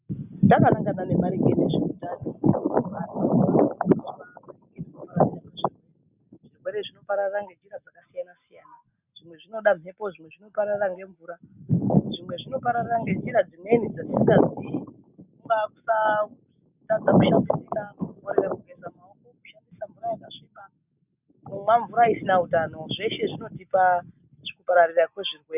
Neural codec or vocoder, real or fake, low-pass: none; real; 3.6 kHz